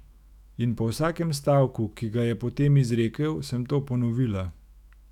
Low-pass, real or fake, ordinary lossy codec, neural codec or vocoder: 19.8 kHz; fake; none; autoencoder, 48 kHz, 128 numbers a frame, DAC-VAE, trained on Japanese speech